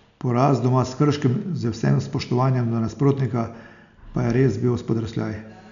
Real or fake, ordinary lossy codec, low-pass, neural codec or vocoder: real; none; 7.2 kHz; none